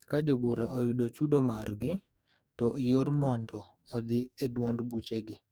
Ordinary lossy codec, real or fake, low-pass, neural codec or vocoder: none; fake; none; codec, 44.1 kHz, 2.6 kbps, DAC